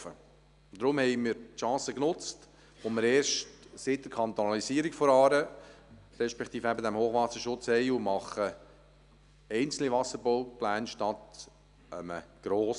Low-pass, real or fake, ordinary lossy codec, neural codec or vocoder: 10.8 kHz; real; none; none